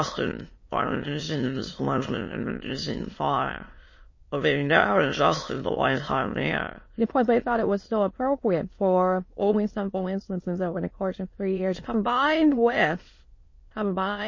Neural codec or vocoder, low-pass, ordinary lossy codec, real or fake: autoencoder, 22.05 kHz, a latent of 192 numbers a frame, VITS, trained on many speakers; 7.2 kHz; MP3, 32 kbps; fake